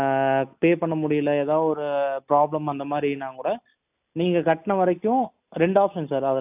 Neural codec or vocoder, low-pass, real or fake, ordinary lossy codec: none; 3.6 kHz; real; AAC, 32 kbps